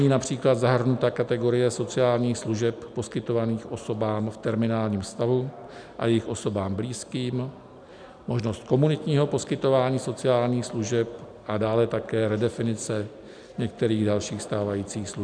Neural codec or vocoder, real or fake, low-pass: none; real; 9.9 kHz